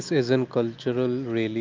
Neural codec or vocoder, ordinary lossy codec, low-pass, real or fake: none; Opus, 24 kbps; 7.2 kHz; real